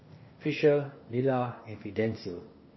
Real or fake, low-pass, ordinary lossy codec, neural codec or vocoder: fake; 7.2 kHz; MP3, 24 kbps; codec, 16 kHz, 0.8 kbps, ZipCodec